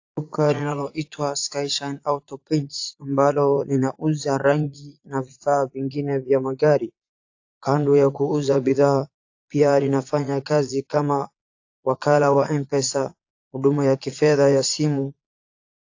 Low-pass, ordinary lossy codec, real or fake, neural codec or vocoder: 7.2 kHz; AAC, 48 kbps; fake; vocoder, 22.05 kHz, 80 mel bands, Vocos